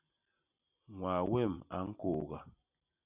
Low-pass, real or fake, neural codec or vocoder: 3.6 kHz; real; none